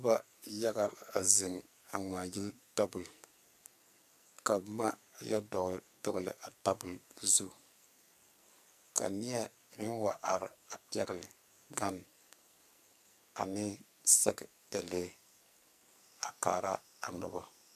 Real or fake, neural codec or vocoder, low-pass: fake; codec, 44.1 kHz, 2.6 kbps, SNAC; 14.4 kHz